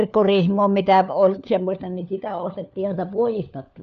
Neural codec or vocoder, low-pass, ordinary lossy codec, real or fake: codec, 16 kHz, 4 kbps, FunCodec, trained on LibriTTS, 50 frames a second; 7.2 kHz; none; fake